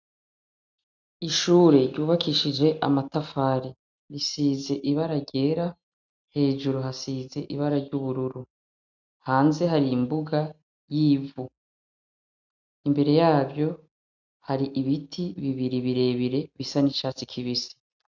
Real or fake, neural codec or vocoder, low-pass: real; none; 7.2 kHz